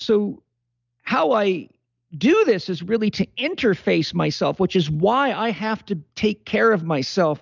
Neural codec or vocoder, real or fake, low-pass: none; real; 7.2 kHz